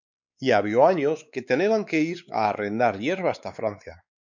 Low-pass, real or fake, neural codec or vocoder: 7.2 kHz; fake; codec, 16 kHz, 4 kbps, X-Codec, WavLM features, trained on Multilingual LibriSpeech